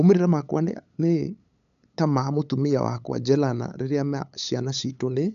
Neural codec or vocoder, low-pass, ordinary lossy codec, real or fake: codec, 16 kHz, 8 kbps, FunCodec, trained on LibriTTS, 25 frames a second; 7.2 kHz; none; fake